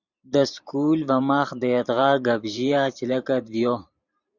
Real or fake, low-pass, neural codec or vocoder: fake; 7.2 kHz; vocoder, 44.1 kHz, 128 mel bands every 512 samples, BigVGAN v2